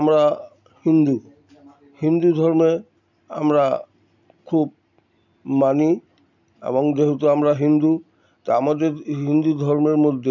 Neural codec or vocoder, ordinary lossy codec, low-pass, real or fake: none; none; 7.2 kHz; real